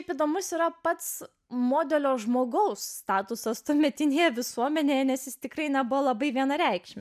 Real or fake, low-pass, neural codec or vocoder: real; 14.4 kHz; none